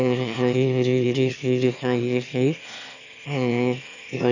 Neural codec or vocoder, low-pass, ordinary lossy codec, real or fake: autoencoder, 22.05 kHz, a latent of 192 numbers a frame, VITS, trained on one speaker; 7.2 kHz; none; fake